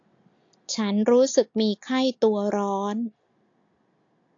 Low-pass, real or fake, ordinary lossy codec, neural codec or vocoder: 7.2 kHz; real; AAC, 48 kbps; none